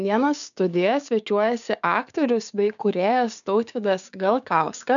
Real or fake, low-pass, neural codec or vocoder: fake; 7.2 kHz; codec, 16 kHz, 6 kbps, DAC